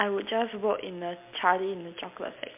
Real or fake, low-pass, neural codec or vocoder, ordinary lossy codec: real; 3.6 kHz; none; MP3, 32 kbps